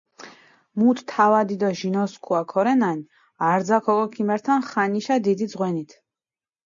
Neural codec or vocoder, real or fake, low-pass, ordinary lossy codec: none; real; 7.2 kHz; MP3, 64 kbps